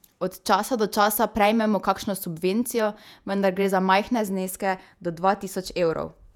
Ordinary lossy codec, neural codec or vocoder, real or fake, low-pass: none; vocoder, 48 kHz, 128 mel bands, Vocos; fake; 19.8 kHz